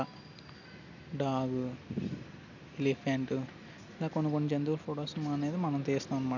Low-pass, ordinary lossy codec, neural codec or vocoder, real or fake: 7.2 kHz; none; none; real